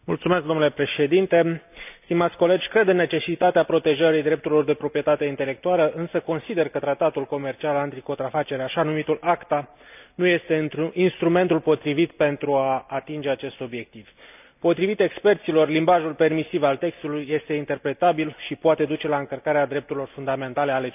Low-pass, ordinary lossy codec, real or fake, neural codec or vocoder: 3.6 kHz; none; real; none